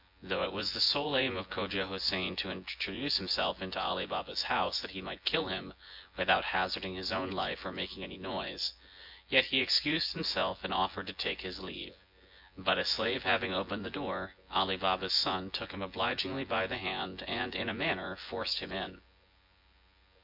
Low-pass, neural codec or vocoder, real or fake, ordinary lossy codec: 5.4 kHz; vocoder, 24 kHz, 100 mel bands, Vocos; fake; MP3, 48 kbps